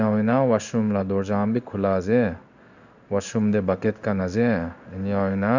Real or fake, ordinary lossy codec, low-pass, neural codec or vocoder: fake; none; 7.2 kHz; codec, 16 kHz in and 24 kHz out, 1 kbps, XY-Tokenizer